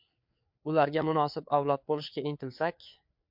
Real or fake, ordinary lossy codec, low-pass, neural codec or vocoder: fake; MP3, 48 kbps; 5.4 kHz; codec, 16 kHz, 4 kbps, FreqCodec, larger model